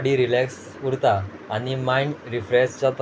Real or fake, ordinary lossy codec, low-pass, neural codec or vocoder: real; none; none; none